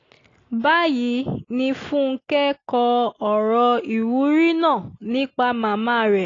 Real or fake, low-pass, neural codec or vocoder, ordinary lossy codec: real; 7.2 kHz; none; AAC, 32 kbps